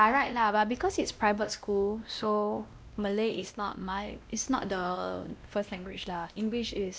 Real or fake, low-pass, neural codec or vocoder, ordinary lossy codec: fake; none; codec, 16 kHz, 1 kbps, X-Codec, WavLM features, trained on Multilingual LibriSpeech; none